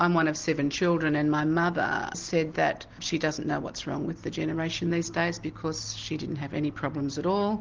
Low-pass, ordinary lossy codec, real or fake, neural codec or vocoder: 7.2 kHz; Opus, 16 kbps; real; none